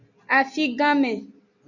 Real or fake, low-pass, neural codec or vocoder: real; 7.2 kHz; none